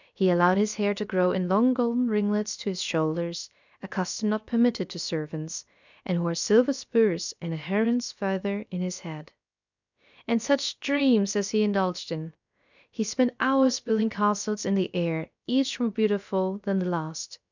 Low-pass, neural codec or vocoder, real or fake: 7.2 kHz; codec, 16 kHz, about 1 kbps, DyCAST, with the encoder's durations; fake